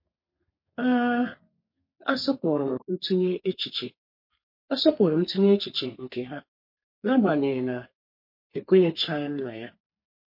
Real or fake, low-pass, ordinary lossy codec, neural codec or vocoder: fake; 5.4 kHz; MP3, 32 kbps; codec, 32 kHz, 1.9 kbps, SNAC